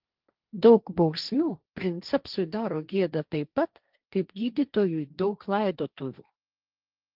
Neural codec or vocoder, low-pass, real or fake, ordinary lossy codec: codec, 16 kHz, 1.1 kbps, Voila-Tokenizer; 5.4 kHz; fake; Opus, 24 kbps